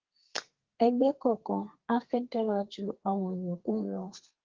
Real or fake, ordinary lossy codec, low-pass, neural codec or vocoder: fake; Opus, 16 kbps; 7.2 kHz; codec, 32 kHz, 1.9 kbps, SNAC